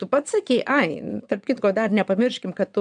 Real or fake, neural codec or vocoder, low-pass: fake; vocoder, 22.05 kHz, 80 mel bands, WaveNeXt; 9.9 kHz